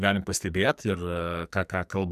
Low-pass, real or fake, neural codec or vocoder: 14.4 kHz; fake; codec, 44.1 kHz, 2.6 kbps, SNAC